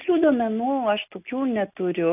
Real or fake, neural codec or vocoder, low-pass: real; none; 3.6 kHz